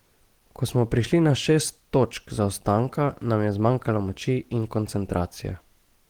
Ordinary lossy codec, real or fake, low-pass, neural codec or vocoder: Opus, 16 kbps; real; 19.8 kHz; none